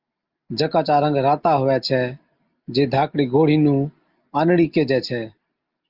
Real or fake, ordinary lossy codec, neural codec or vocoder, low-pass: real; Opus, 24 kbps; none; 5.4 kHz